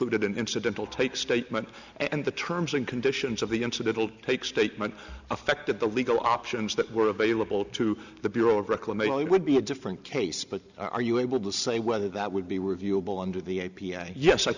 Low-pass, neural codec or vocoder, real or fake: 7.2 kHz; none; real